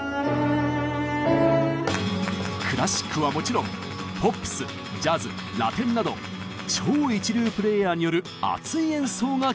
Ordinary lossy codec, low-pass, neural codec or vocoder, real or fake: none; none; none; real